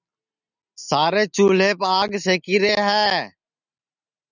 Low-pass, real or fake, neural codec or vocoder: 7.2 kHz; real; none